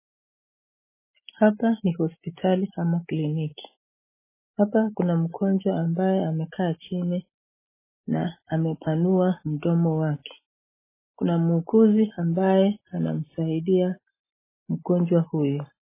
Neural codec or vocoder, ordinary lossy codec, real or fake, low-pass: none; MP3, 16 kbps; real; 3.6 kHz